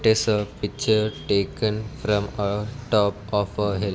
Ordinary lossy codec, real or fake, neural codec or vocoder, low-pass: none; real; none; none